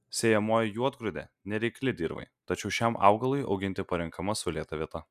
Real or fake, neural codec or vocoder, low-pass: real; none; 14.4 kHz